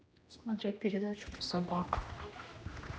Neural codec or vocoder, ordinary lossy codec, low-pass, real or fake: codec, 16 kHz, 1 kbps, X-Codec, HuBERT features, trained on general audio; none; none; fake